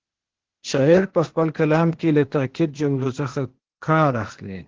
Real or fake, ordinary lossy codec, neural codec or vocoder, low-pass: fake; Opus, 16 kbps; codec, 16 kHz, 0.8 kbps, ZipCodec; 7.2 kHz